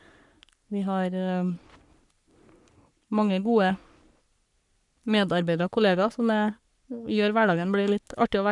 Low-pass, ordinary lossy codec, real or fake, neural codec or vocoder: 10.8 kHz; none; fake; codec, 44.1 kHz, 3.4 kbps, Pupu-Codec